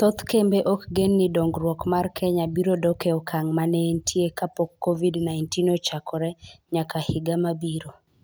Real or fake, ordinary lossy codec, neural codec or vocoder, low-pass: real; none; none; none